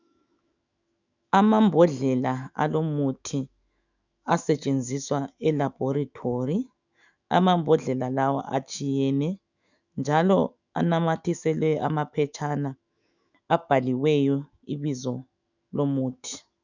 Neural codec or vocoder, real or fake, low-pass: autoencoder, 48 kHz, 128 numbers a frame, DAC-VAE, trained on Japanese speech; fake; 7.2 kHz